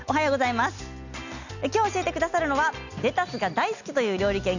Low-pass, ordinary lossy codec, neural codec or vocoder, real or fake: 7.2 kHz; none; none; real